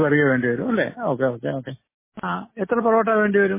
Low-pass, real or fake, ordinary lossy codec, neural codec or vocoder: 3.6 kHz; real; MP3, 16 kbps; none